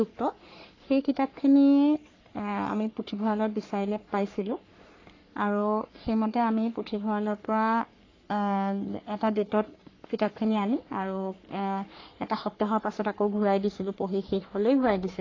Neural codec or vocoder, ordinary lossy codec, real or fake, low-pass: codec, 44.1 kHz, 3.4 kbps, Pupu-Codec; AAC, 32 kbps; fake; 7.2 kHz